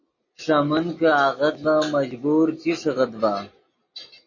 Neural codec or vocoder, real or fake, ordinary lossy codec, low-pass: none; real; MP3, 32 kbps; 7.2 kHz